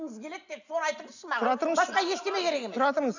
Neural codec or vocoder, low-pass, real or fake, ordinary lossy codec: none; 7.2 kHz; real; none